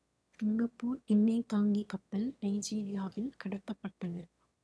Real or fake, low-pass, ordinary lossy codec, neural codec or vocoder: fake; none; none; autoencoder, 22.05 kHz, a latent of 192 numbers a frame, VITS, trained on one speaker